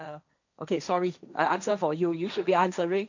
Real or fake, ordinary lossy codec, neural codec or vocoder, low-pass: fake; none; codec, 16 kHz, 1.1 kbps, Voila-Tokenizer; 7.2 kHz